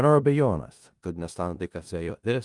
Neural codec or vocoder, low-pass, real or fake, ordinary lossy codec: codec, 16 kHz in and 24 kHz out, 0.4 kbps, LongCat-Audio-Codec, four codebook decoder; 10.8 kHz; fake; Opus, 32 kbps